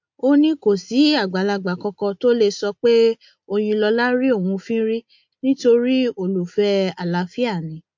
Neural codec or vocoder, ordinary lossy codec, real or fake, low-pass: none; MP3, 48 kbps; real; 7.2 kHz